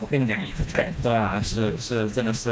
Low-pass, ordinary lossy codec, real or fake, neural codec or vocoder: none; none; fake; codec, 16 kHz, 1 kbps, FreqCodec, smaller model